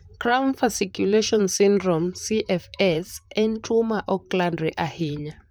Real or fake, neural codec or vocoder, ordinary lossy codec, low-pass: fake; vocoder, 44.1 kHz, 128 mel bands, Pupu-Vocoder; none; none